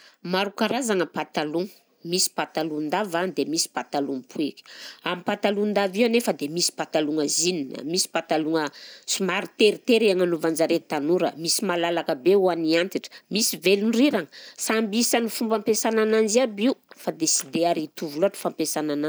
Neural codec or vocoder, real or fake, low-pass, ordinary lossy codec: none; real; none; none